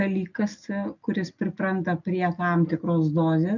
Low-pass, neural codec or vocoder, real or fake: 7.2 kHz; none; real